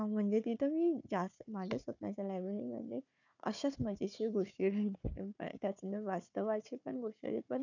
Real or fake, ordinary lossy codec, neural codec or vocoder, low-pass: fake; none; codec, 16 kHz, 4 kbps, FunCodec, trained on Chinese and English, 50 frames a second; 7.2 kHz